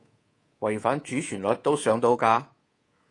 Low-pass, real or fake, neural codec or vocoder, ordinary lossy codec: 10.8 kHz; fake; codec, 24 kHz, 3.1 kbps, DualCodec; AAC, 32 kbps